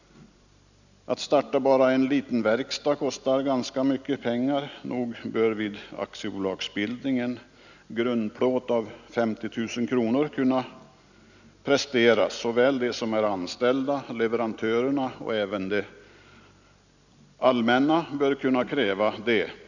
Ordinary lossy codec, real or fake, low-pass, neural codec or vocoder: none; real; 7.2 kHz; none